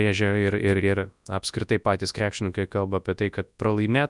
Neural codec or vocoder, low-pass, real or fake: codec, 24 kHz, 0.9 kbps, WavTokenizer, large speech release; 10.8 kHz; fake